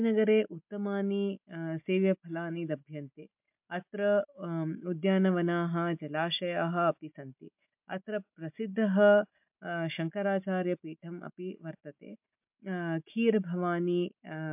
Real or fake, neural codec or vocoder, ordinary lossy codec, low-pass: real; none; MP3, 32 kbps; 3.6 kHz